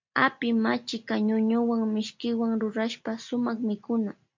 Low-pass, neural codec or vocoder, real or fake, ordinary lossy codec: 7.2 kHz; none; real; MP3, 48 kbps